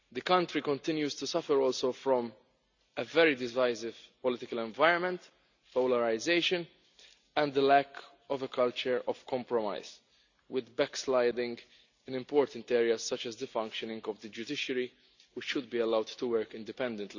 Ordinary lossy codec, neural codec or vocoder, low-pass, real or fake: none; none; 7.2 kHz; real